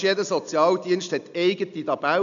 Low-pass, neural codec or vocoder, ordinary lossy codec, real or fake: 7.2 kHz; none; none; real